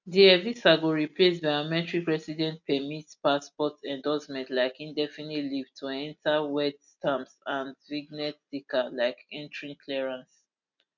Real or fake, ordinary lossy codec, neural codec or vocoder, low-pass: real; none; none; 7.2 kHz